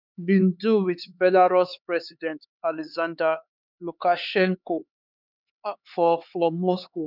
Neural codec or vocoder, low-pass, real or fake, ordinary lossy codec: codec, 16 kHz, 4 kbps, X-Codec, HuBERT features, trained on LibriSpeech; 5.4 kHz; fake; none